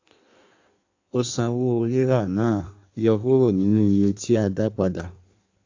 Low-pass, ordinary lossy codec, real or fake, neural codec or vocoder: 7.2 kHz; AAC, 48 kbps; fake; codec, 16 kHz in and 24 kHz out, 1.1 kbps, FireRedTTS-2 codec